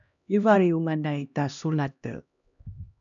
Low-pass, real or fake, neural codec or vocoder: 7.2 kHz; fake; codec, 16 kHz, 1 kbps, X-Codec, HuBERT features, trained on LibriSpeech